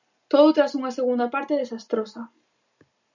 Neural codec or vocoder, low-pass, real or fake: none; 7.2 kHz; real